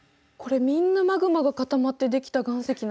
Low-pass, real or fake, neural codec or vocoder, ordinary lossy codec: none; real; none; none